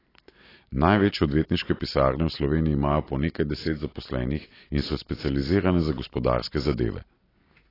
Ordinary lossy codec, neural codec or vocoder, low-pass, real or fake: AAC, 24 kbps; none; 5.4 kHz; real